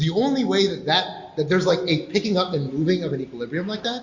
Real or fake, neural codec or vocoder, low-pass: real; none; 7.2 kHz